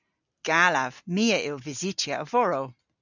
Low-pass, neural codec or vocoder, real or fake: 7.2 kHz; none; real